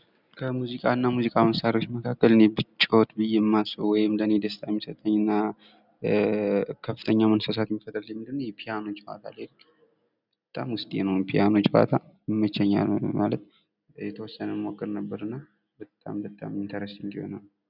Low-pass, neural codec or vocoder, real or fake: 5.4 kHz; none; real